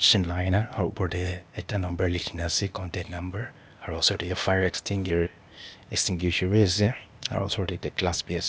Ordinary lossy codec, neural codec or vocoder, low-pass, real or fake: none; codec, 16 kHz, 0.8 kbps, ZipCodec; none; fake